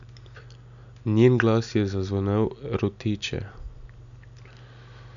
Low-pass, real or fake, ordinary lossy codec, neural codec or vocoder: 7.2 kHz; real; none; none